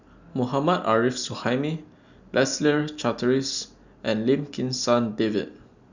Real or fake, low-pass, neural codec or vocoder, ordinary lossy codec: real; 7.2 kHz; none; none